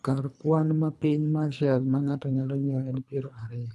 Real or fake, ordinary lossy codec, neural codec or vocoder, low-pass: fake; none; codec, 24 kHz, 3 kbps, HILCodec; none